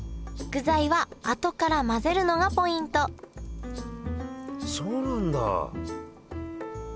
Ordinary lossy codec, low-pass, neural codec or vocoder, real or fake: none; none; none; real